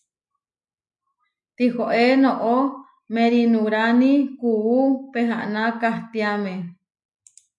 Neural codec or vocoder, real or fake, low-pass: none; real; 10.8 kHz